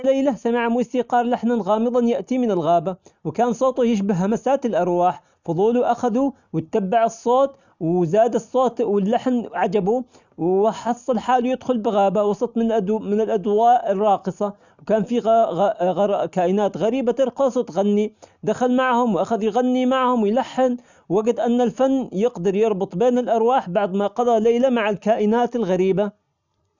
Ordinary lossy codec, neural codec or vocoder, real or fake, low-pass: none; none; real; 7.2 kHz